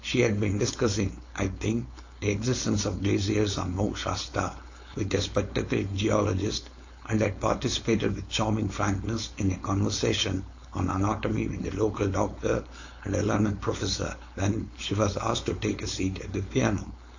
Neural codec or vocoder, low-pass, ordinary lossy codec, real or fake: codec, 16 kHz, 4.8 kbps, FACodec; 7.2 kHz; AAC, 48 kbps; fake